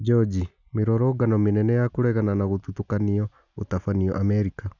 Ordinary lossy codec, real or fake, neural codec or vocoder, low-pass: none; real; none; 7.2 kHz